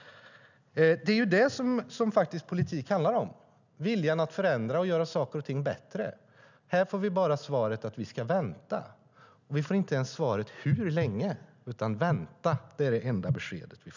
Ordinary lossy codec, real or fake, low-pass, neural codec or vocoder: none; real; 7.2 kHz; none